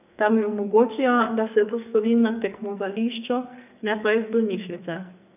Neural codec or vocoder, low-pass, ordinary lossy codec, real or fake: codec, 44.1 kHz, 2.6 kbps, SNAC; 3.6 kHz; none; fake